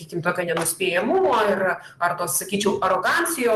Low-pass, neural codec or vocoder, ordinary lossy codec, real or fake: 14.4 kHz; vocoder, 44.1 kHz, 128 mel bands every 512 samples, BigVGAN v2; Opus, 24 kbps; fake